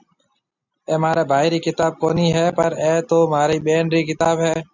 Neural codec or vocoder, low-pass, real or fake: none; 7.2 kHz; real